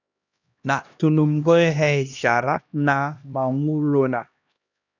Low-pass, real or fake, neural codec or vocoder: 7.2 kHz; fake; codec, 16 kHz, 1 kbps, X-Codec, HuBERT features, trained on LibriSpeech